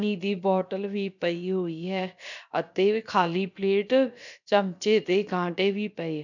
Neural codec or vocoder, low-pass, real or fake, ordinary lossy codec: codec, 16 kHz, about 1 kbps, DyCAST, with the encoder's durations; 7.2 kHz; fake; none